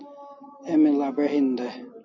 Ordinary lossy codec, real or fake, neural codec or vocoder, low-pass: MP3, 32 kbps; real; none; 7.2 kHz